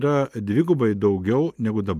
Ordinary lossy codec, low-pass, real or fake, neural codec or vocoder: Opus, 32 kbps; 14.4 kHz; real; none